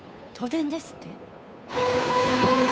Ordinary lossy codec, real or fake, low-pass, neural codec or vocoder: none; fake; none; codec, 16 kHz, 2 kbps, FunCodec, trained on Chinese and English, 25 frames a second